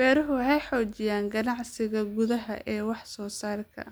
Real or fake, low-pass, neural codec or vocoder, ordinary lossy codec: real; none; none; none